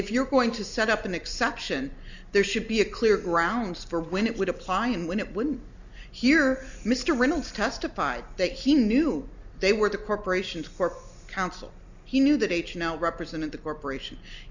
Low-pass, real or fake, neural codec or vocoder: 7.2 kHz; real; none